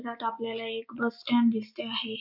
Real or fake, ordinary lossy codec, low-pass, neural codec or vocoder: real; none; 5.4 kHz; none